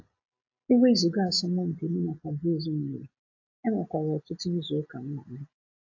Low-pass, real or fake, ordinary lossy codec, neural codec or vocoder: 7.2 kHz; real; AAC, 48 kbps; none